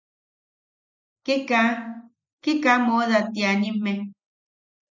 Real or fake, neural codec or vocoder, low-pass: real; none; 7.2 kHz